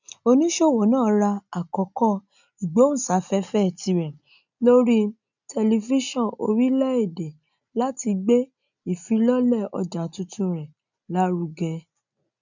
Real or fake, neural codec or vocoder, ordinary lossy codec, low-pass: real; none; none; 7.2 kHz